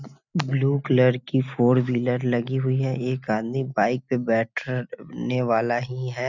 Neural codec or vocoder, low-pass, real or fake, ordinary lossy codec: none; 7.2 kHz; real; none